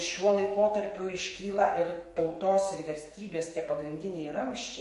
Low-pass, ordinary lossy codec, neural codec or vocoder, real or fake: 14.4 kHz; MP3, 48 kbps; codec, 44.1 kHz, 2.6 kbps, SNAC; fake